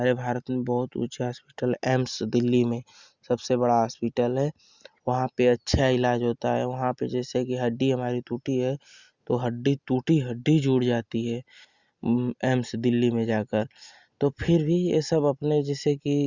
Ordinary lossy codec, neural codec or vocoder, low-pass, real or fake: Opus, 64 kbps; none; 7.2 kHz; real